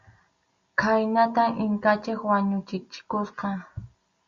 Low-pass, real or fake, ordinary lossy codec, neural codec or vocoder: 7.2 kHz; real; Opus, 64 kbps; none